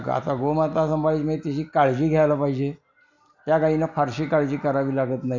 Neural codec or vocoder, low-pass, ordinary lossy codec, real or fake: none; 7.2 kHz; none; real